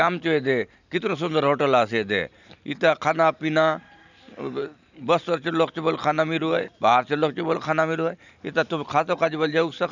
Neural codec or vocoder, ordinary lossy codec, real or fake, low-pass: none; none; real; 7.2 kHz